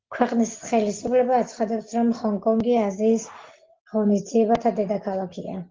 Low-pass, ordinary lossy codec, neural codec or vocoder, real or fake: 7.2 kHz; Opus, 16 kbps; none; real